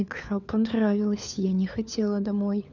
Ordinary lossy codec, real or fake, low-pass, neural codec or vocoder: none; fake; 7.2 kHz; codec, 16 kHz, 4 kbps, FunCodec, trained on LibriTTS, 50 frames a second